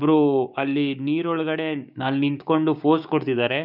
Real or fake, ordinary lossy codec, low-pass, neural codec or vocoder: fake; none; 5.4 kHz; codec, 16 kHz, 6 kbps, DAC